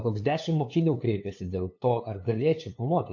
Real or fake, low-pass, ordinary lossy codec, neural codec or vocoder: fake; 7.2 kHz; AAC, 48 kbps; codec, 16 kHz, 2 kbps, FunCodec, trained on LibriTTS, 25 frames a second